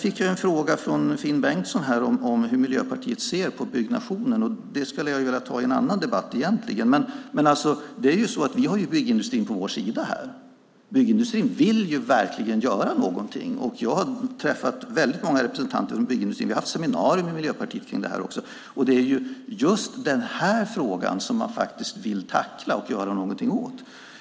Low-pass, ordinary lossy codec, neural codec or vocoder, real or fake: none; none; none; real